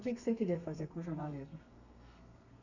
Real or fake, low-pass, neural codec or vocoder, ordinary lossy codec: fake; 7.2 kHz; codec, 32 kHz, 1.9 kbps, SNAC; none